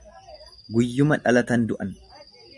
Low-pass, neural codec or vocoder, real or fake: 10.8 kHz; none; real